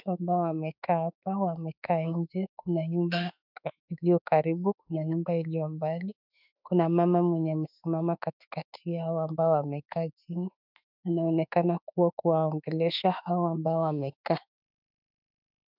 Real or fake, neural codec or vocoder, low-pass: fake; autoencoder, 48 kHz, 32 numbers a frame, DAC-VAE, trained on Japanese speech; 5.4 kHz